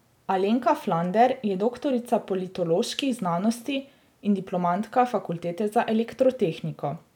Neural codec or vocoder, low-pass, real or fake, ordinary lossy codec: none; 19.8 kHz; real; none